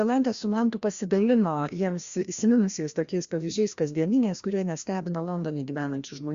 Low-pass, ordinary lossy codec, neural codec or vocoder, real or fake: 7.2 kHz; Opus, 64 kbps; codec, 16 kHz, 1 kbps, FreqCodec, larger model; fake